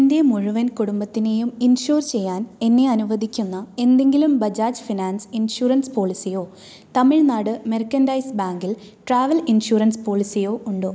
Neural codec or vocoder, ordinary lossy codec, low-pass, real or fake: none; none; none; real